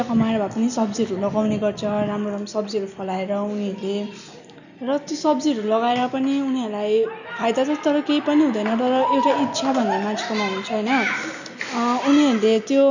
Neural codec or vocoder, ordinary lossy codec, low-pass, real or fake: none; none; 7.2 kHz; real